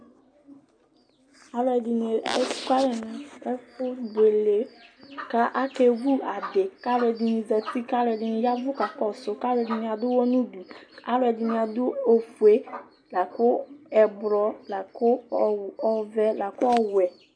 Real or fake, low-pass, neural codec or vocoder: real; 9.9 kHz; none